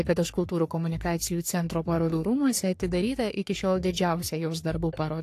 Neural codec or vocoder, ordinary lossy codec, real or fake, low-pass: codec, 44.1 kHz, 3.4 kbps, Pupu-Codec; AAC, 64 kbps; fake; 14.4 kHz